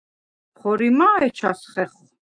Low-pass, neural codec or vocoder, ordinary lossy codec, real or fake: 9.9 kHz; autoencoder, 48 kHz, 128 numbers a frame, DAC-VAE, trained on Japanese speech; AAC, 64 kbps; fake